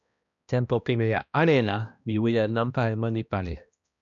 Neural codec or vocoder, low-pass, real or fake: codec, 16 kHz, 1 kbps, X-Codec, HuBERT features, trained on balanced general audio; 7.2 kHz; fake